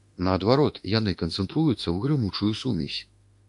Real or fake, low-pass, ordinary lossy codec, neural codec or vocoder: fake; 10.8 kHz; AAC, 64 kbps; autoencoder, 48 kHz, 32 numbers a frame, DAC-VAE, trained on Japanese speech